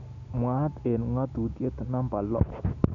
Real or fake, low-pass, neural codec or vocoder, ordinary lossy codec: real; 7.2 kHz; none; none